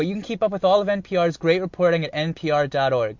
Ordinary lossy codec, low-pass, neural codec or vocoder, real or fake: MP3, 48 kbps; 7.2 kHz; none; real